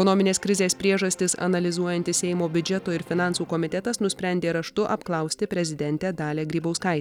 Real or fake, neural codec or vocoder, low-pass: real; none; 19.8 kHz